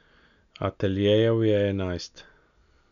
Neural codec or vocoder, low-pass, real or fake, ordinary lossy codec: none; 7.2 kHz; real; none